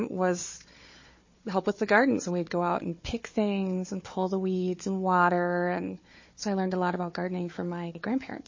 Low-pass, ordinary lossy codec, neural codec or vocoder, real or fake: 7.2 kHz; MP3, 32 kbps; codec, 16 kHz, 4 kbps, FunCodec, trained on Chinese and English, 50 frames a second; fake